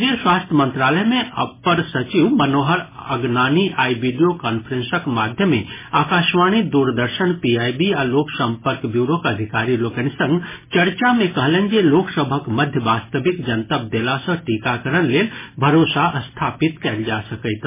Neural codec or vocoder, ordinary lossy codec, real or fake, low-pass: none; MP3, 16 kbps; real; 3.6 kHz